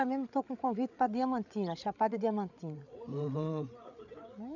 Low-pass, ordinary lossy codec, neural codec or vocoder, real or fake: 7.2 kHz; none; codec, 16 kHz, 8 kbps, FreqCodec, larger model; fake